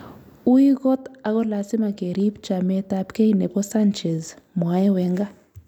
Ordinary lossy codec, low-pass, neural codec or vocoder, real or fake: none; 19.8 kHz; none; real